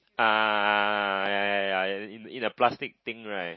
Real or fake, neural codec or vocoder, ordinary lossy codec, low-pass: real; none; MP3, 24 kbps; 7.2 kHz